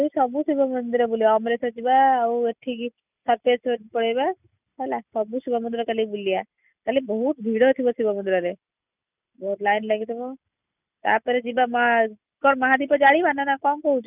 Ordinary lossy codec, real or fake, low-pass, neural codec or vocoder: none; real; 3.6 kHz; none